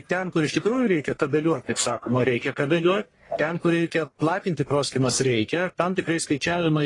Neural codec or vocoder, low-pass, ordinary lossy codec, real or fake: codec, 44.1 kHz, 1.7 kbps, Pupu-Codec; 10.8 kHz; AAC, 32 kbps; fake